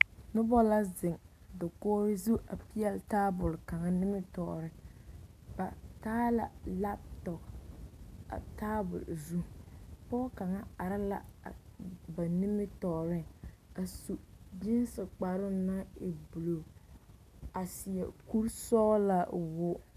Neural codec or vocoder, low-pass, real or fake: none; 14.4 kHz; real